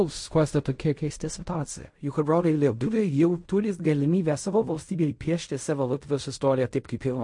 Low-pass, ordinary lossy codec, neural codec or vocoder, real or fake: 10.8 kHz; MP3, 48 kbps; codec, 16 kHz in and 24 kHz out, 0.4 kbps, LongCat-Audio-Codec, fine tuned four codebook decoder; fake